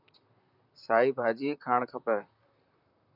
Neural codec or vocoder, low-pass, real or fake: vocoder, 44.1 kHz, 128 mel bands, Pupu-Vocoder; 5.4 kHz; fake